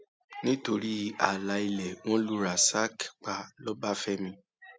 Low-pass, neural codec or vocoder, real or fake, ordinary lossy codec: none; none; real; none